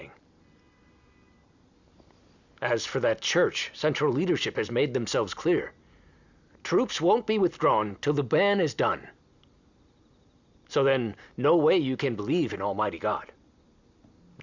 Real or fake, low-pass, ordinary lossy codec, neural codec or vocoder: real; 7.2 kHz; Opus, 64 kbps; none